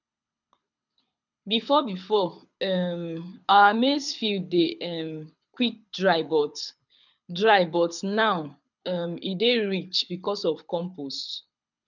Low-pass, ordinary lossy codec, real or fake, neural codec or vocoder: 7.2 kHz; none; fake; codec, 24 kHz, 6 kbps, HILCodec